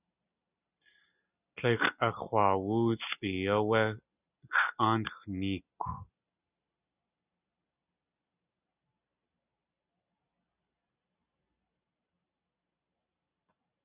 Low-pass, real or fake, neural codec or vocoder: 3.6 kHz; real; none